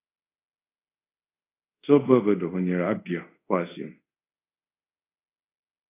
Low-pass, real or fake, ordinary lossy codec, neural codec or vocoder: 3.6 kHz; fake; AAC, 24 kbps; codec, 24 kHz, 0.5 kbps, DualCodec